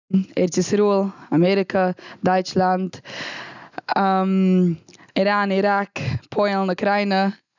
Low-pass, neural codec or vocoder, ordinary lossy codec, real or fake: 7.2 kHz; none; none; real